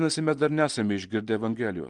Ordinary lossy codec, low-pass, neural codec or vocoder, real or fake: Opus, 24 kbps; 10.8 kHz; none; real